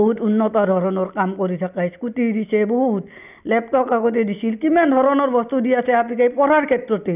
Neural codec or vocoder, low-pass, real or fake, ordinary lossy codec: none; 3.6 kHz; real; none